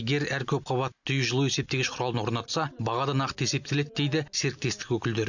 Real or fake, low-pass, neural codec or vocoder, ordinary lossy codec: real; 7.2 kHz; none; none